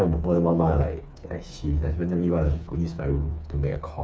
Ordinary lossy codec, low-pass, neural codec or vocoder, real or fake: none; none; codec, 16 kHz, 4 kbps, FreqCodec, smaller model; fake